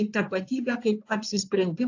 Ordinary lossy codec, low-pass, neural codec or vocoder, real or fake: AAC, 48 kbps; 7.2 kHz; codec, 16 kHz in and 24 kHz out, 2.2 kbps, FireRedTTS-2 codec; fake